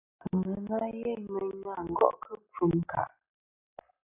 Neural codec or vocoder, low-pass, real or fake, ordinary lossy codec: none; 3.6 kHz; real; AAC, 32 kbps